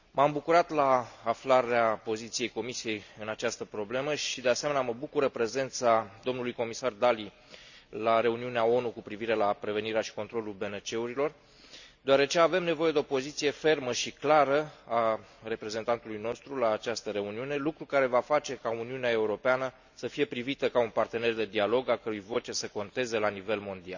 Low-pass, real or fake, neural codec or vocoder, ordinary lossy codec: 7.2 kHz; real; none; none